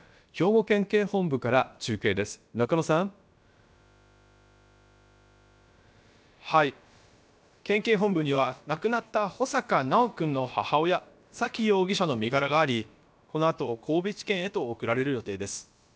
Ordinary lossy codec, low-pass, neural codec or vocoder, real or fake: none; none; codec, 16 kHz, about 1 kbps, DyCAST, with the encoder's durations; fake